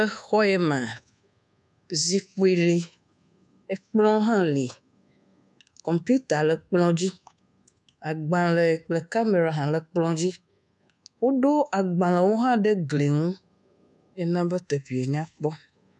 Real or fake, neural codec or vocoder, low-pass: fake; codec, 24 kHz, 1.2 kbps, DualCodec; 10.8 kHz